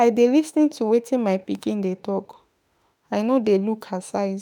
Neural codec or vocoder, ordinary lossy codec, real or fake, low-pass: autoencoder, 48 kHz, 32 numbers a frame, DAC-VAE, trained on Japanese speech; none; fake; none